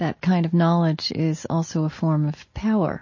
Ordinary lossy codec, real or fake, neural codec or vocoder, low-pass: MP3, 32 kbps; real; none; 7.2 kHz